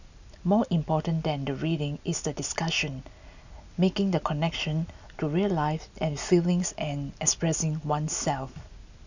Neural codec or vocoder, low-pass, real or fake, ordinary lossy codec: none; 7.2 kHz; real; none